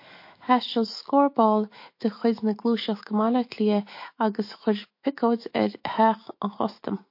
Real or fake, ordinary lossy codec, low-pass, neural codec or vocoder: fake; MP3, 32 kbps; 5.4 kHz; autoencoder, 48 kHz, 128 numbers a frame, DAC-VAE, trained on Japanese speech